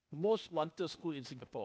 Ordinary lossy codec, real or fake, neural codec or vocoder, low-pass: none; fake; codec, 16 kHz, 0.8 kbps, ZipCodec; none